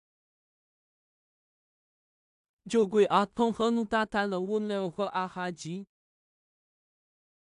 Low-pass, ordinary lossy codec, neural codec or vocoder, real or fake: 10.8 kHz; AAC, 96 kbps; codec, 16 kHz in and 24 kHz out, 0.4 kbps, LongCat-Audio-Codec, two codebook decoder; fake